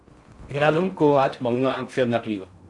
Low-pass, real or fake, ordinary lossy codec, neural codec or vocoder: 10.8 kHz; fake; AAC, 64 kbps; codec, 16 kHz in and 24 kHz out, 0.6 kbps, FocalCodec, streaming, 4096 codes